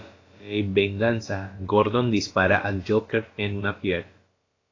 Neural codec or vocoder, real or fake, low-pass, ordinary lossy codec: codec, 16 kHz, about 1 kbps, DyCAST, with the encoder's durations; fake; 7.2 kHz; AAC, 32 kbps